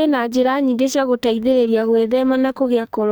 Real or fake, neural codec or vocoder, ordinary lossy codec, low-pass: fake; codec, 44.1 kHz, 2.6 kbps, SNAC; none; none